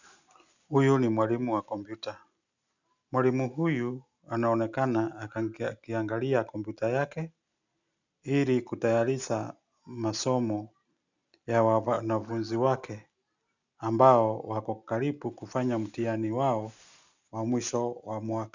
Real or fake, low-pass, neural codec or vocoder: real; 7.2 kHz; none